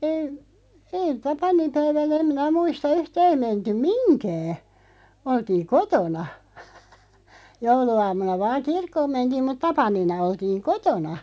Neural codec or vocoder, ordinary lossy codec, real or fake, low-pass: none; none; real; none